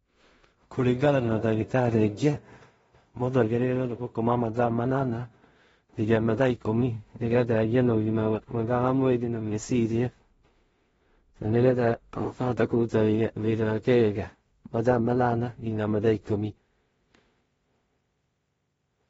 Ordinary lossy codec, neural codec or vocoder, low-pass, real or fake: AAC, 24 kbps; codec, 16 kHz in and 24 kHz out, 0.4 kbps, LongCat-Audio-Codec, two codebook decoder; 10.8 kHz; fake